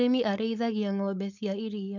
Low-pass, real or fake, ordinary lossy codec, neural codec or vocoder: 7.2 kHz; fake; none; codec, 16 kHz, 4.8 kbps, FACodec